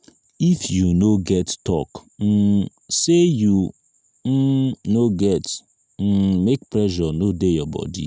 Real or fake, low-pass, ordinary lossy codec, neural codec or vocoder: real; none; none; none